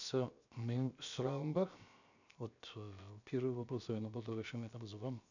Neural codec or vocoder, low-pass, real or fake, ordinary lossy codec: codec, 16 kHz, 0.7 kbps, FocalCodec; 7.2 kHz; fake; none